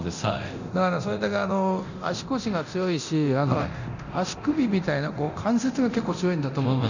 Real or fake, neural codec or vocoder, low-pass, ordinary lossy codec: fake; codec, 24 kHz, 0.9 kbps, DualCodec; 7.2 kHz; none